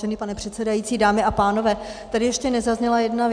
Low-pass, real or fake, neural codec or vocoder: 9.9 kHz; real; none